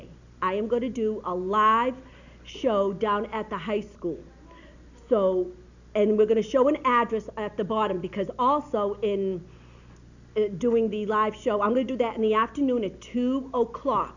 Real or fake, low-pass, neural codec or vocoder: real; 7.2 kHz; none